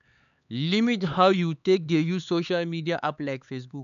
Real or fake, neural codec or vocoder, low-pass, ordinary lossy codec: fake; codec, 16 kHz, 4 kbps, X-Codec, HuBERT features, trained on LibriSpeech; 7.2 kHz; none